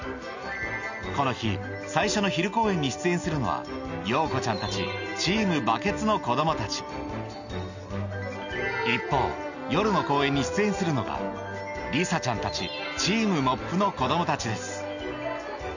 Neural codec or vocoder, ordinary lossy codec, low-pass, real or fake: none; none; 7.2 kHz; real